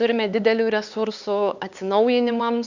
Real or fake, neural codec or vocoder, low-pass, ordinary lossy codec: fake; codec, 16 kHz, 4 kbps, X-Codec, HuBERT features, trained on LibriSpeech; 7.2 kHz; Opus, 64 kbps